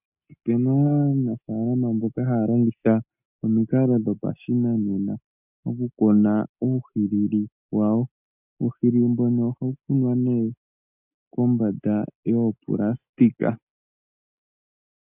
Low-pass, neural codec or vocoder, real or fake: 3.6 kHz; none; real